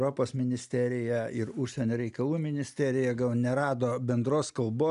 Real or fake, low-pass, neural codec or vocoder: real; 10.8 kHz; none